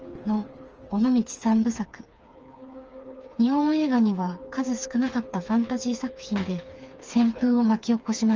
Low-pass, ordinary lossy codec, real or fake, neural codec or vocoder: 7.2 kHz; Opus, 24 kbps; fake; codec, 16 kHz, 4 kbps, FreqCodec, smaller model